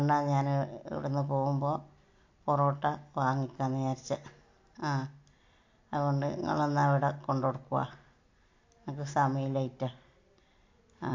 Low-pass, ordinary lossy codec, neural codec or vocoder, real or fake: 7.2 kHz; MP3, 48 kbps; none; real